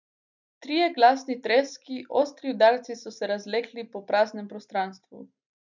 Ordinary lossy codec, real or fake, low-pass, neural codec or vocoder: none; real; 7.2 kHz; none